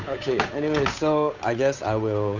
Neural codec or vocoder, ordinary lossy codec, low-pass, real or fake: codec, 16 kHz, 8 kbps, FunCodec, trained on Chinese and English, 25 frames a second; none; 7.2 kHz; fake